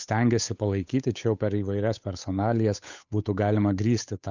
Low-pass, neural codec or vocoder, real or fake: 7.2 kHz; codec, 16 kHz, 8 kbps, FunCodec, trained on Chinese and English, 25 frames a second; fake